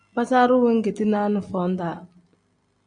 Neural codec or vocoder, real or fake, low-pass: none; real; 9.9 kHz